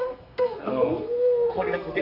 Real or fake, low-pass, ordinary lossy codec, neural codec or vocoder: fake; 5.4 kHz; none; codec, 32 kHz, 1.9 kbps, SNAC